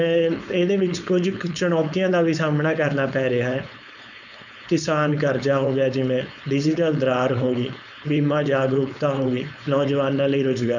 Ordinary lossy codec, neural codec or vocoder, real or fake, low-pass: none; codec, 16 kHz, 4.8 kbps, FACodec; fake; 7.2 kHz